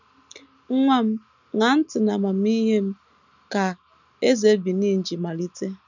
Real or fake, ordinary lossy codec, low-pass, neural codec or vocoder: real; none; 7.2 kHz; none